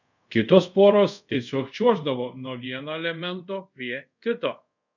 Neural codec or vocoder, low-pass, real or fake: codec, 24 kHz, 0.5 kbps, DualCodec; 7.2 kHz; fake